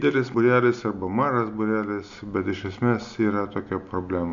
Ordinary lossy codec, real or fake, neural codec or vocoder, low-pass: MP3, 64 kbps; real; none; 7.2 kHz